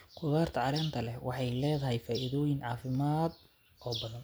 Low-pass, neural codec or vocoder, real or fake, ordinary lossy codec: none; none; real; none